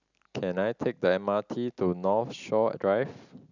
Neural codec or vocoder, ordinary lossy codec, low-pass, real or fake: none; none; 7.2 kHz; real